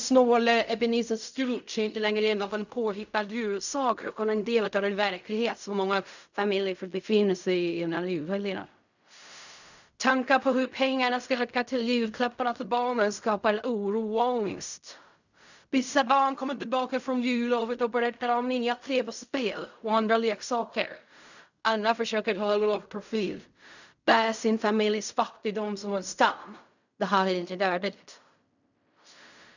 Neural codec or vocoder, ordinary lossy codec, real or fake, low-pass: codec, 16 kHz in and 24 kHz out, 0.4 kbps, LongCat-Audio-Codec, fine tuned four codebook decoder; none; fake; 7.2 kHz